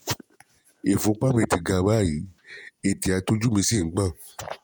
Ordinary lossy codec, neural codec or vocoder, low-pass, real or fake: none; vocoder, 48 kHz, 128 mel bands, Vocos; none; fake